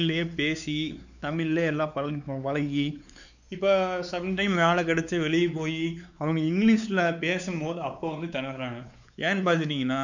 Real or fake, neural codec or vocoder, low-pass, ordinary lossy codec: fake; codec, 16 kHz, 4 kbps, X-Codec, WavLM features, trained on Multilingual LibriSpeech; 7.2 kHz; none